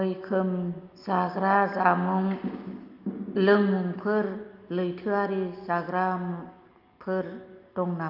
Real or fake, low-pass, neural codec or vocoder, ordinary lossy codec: real; 5.4 kHz; none; Opus, 32 kbps